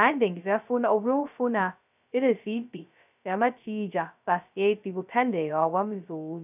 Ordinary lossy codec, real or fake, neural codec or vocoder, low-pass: none; fake; codec, 16 kHz, 0.2 kbps, FocalCodec; 3.6 kHz